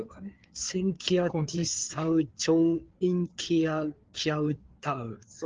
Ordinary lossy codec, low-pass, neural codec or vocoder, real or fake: Opus, 16 kbps; 7.2 kHz; codec, 16 kHz, 4 kbps, FreqCodec, larger model; fake